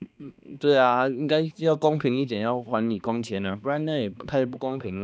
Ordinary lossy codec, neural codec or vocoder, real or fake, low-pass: none; codec, 16 kHz, 2 kbps, X-Codec, HuBERT features, trained on balanced general audio; fake; none